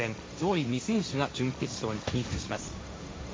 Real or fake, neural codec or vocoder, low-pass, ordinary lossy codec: fake; codec, 16 kHz, 1.1 kbps, Voila-Tokenizer; none; none